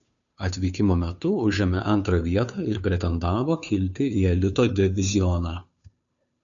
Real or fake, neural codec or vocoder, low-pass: fake; codec, 16 kHz, 2 kbps, FunCodec, trained on Chinese and English, 25 frames a second; 7.2 kHz